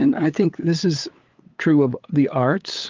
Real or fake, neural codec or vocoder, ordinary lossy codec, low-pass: fake; codec, 16 kHz, 8 kbps, FunCodec, trained on LibriTTS, 25 frames a second; Opus, 32 kbps; 7.2 kHz